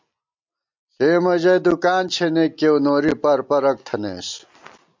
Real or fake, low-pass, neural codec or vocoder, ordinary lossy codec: real; 7.2 kHz; none; MP3, 64 kbps